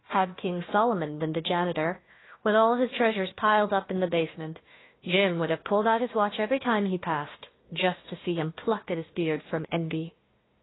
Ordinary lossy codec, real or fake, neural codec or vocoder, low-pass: AAC, 16 kbps; fake; codec, 16 kHz, 1 kbps, FunCodec, trained on Chinese and English, 50 frames a second; 7.2 kHz